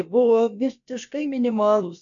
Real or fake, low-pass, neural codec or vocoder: fake; 7.2 kHz; codec, 16 kHz, about 1 kbps, DyCAST, with the encoder's durations